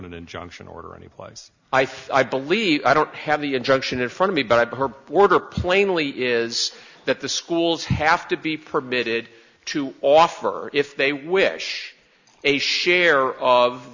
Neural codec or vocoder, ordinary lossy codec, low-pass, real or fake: none; Opus, 64 kbps; 7.2 kHz; real